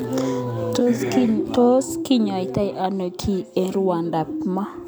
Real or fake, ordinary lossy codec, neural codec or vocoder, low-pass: real; none; none; none